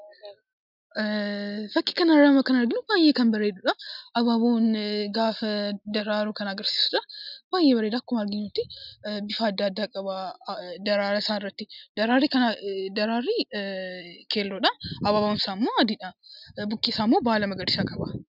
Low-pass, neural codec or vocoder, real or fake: 5.4 kHz; none; real